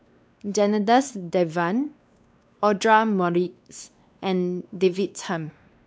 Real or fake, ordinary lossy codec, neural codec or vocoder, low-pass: fake; none; codec, 16 kHz, 2 kbps, X-Codec, WavLM features, trained on Multilingual LibriSpeech; none